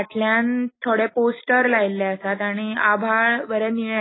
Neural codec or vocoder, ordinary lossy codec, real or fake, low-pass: none; AAC, 16 kbps; real; 7.2 kHz